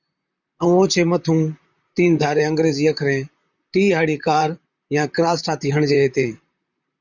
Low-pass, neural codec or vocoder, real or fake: 7.2 kHz; vocoder, 44.1 kHz, 128 mel bands, Pupu-Vocoder; fake